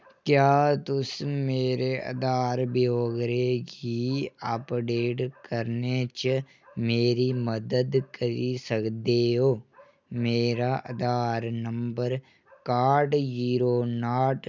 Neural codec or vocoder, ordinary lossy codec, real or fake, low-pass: none; none; real; none